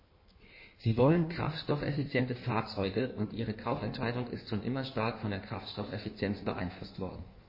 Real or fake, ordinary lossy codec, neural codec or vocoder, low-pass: fake; MP3, 24 kbps; codec, 16 kHz in and 24 kHz out, 1.1 kbps, FireRedTTS-2 codec; 5.4 kHz